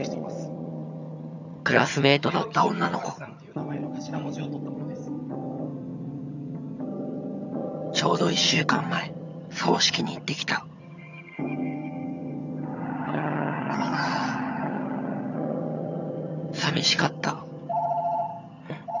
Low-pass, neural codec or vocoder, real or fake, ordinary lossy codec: 7.2 kHz; vocoder, 22.05 kHz, 80 mel bands, HiFi-GAN; fake; none